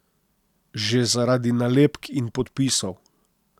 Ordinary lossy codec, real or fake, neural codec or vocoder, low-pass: none; real; none; 19.8 kHz